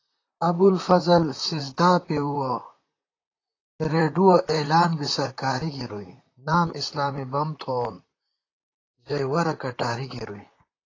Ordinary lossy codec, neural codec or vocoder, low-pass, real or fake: AAC, 32 kbps; vocoder, 44.1 kHz, 128 mel bands, Pupu-Vocoder; 7.2 kHz; fake